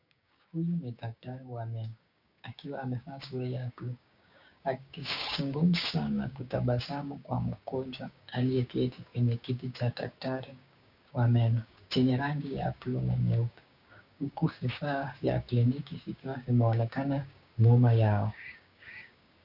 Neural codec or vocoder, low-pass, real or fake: codec, 16 kHz, 6 kbps, DAC; 5.4 kHz; fake